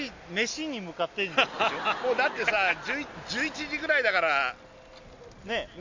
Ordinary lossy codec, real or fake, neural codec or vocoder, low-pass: MP3, 64 kbps; real; none; 7.2 kHz